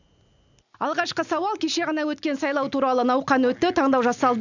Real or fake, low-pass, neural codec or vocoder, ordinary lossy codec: real; 7.2 kHz; none; none